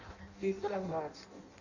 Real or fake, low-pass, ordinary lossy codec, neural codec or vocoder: fake; 7.2 kHz; none; codec, 16 kHz in and 24 kHz out, 0.6 kbps, FireRedTTS-2 codec